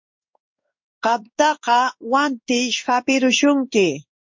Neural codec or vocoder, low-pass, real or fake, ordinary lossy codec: codec, 16 kHz in and 24 kHz out, 1 kbps, XY-Tokenizer; 7.2 kHz; fake; MP3, 48 kbps